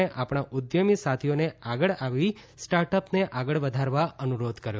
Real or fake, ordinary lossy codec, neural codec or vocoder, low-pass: real; none; none; none